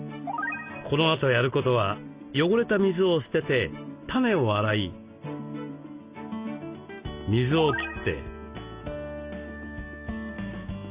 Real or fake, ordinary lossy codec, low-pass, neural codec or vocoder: real; Opus, 64 kbps; 3.6 kHz; none